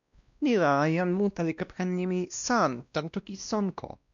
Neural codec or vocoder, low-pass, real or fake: codec, 16 kHz, 1 kbps, X-Codec, WavLM features, trained on Multilingual LibriSpeech; 7.2 kHz; fake